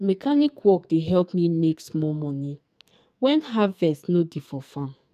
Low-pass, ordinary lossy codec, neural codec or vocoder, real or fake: 14.4 kHz; none; codec, 44.1 kHz, 2.6 kbps, SNAC; fake